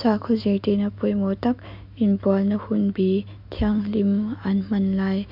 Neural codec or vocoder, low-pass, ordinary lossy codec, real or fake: autoencoder, 48 kHz, 128 numbers a frame, DAC-VAE, trained on Japanese speech; 5.4 kHz; MP3, 48 kbps; fake